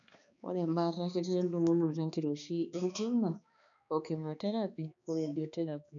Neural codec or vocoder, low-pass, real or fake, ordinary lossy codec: codec, 16 kHz, 2 kbps, X-Codec, HuBERT features, trained on balanced general audio; 7.2 kHz; fake; none